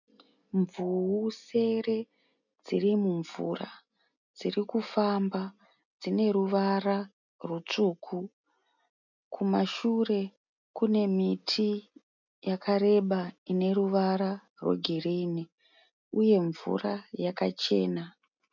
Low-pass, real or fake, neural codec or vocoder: 7.2 kHz; real; none